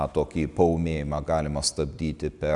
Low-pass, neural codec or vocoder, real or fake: 10.8 kHz; none; real